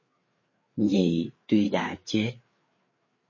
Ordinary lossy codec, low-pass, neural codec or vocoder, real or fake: MP3, 32 kbps; 7.2 kHz; codec, 16 kHz, 4 kbps, FreqCodec, larger model; fake